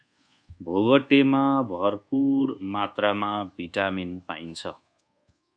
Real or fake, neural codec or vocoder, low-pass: fake; codec, 24 kHz, 1.2 kbps, DualCodec; 9.9 kHz